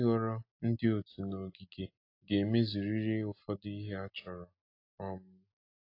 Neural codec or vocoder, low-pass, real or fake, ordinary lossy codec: none; 5.4 kHz; real; AAC, 32 kbps